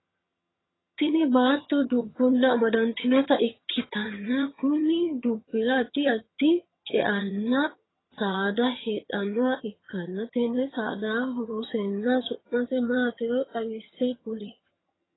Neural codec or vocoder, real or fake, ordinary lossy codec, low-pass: vocoder, 22.05 kHz, 80 mel bands, HiFi-GAN; fake; AAC, 16 kbps; 7.2 kHz